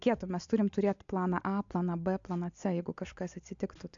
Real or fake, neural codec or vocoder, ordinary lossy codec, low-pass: real; none; AAC, 64 kbps; 7.2 kHz